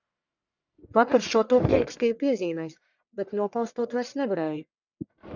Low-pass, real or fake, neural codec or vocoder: 7.2 kHz; fake; codec, 44.1 kHz, 1.7 kbps, Pupu-Codec